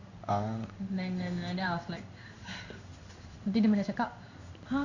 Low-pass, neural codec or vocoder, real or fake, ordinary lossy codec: 7.2 kHz; codec, 16 kHz in and 24 kHz out, 1 kbps, XY-Tokenizer; fake; none